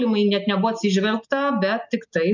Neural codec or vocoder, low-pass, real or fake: none; 7.2 kHz; real